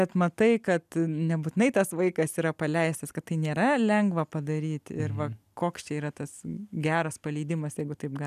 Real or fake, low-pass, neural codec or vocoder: real; 14.4 kHz; none